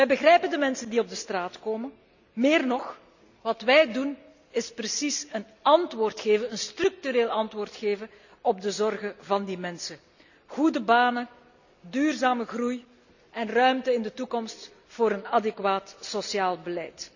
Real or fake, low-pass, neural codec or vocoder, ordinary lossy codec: real; 7.2 kHz; none; none